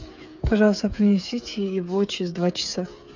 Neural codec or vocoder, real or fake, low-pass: codec, 16 kHz, 8 kbps, FreqCodec, smaller model; fake; 7.2 kHz